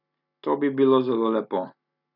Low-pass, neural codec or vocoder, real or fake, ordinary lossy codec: 5.4 kHz; none; real; none